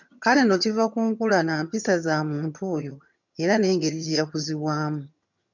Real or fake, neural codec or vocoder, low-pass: fake; vocoder, 22.05 kHz, 80 mel bands, HiFi-GAN; 7.2 kHz